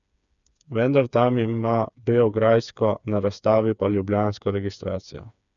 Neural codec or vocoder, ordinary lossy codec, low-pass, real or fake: codec, 16 kHz, 4 kbps, FreqCodec, smaller model; none; 7.2 kHz; fake